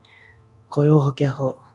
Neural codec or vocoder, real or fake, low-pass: codec, 24 kHz, 0.9 kbps, DualCodec; fake; 10.8 kHz